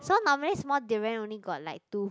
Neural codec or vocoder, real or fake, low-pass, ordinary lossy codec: none; real; none; none